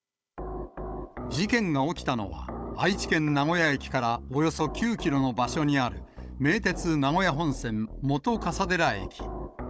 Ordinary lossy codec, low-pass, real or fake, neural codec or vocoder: none; none; fake; codec, 16 kHz, 16 kbps, FunCodec, trained on Chinese and English, 50 frames a second